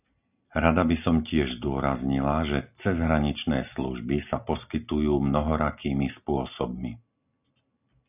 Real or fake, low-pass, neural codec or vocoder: real; 3.6 kHz; none